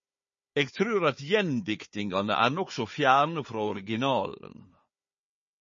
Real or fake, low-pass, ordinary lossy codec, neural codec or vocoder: fake; 7.2 kHz; MP3, 32 kbps; codec, 16 kHz, 4 kbps, FunCodec, trained on Chinese and English, 50 frames a second